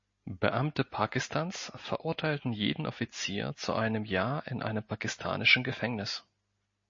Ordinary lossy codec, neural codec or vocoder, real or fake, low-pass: MP3, 32 kbps; none; real; 7.2 kHz